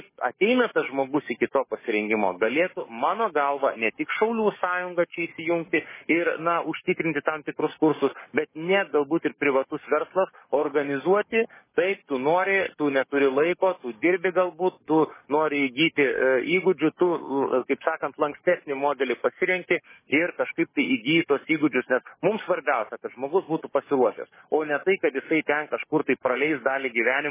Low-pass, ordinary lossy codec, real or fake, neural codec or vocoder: 3.6 kHz; MP3, 16 kbps; fake; codec, 44.1 kHz, 7.8 kbps, DAC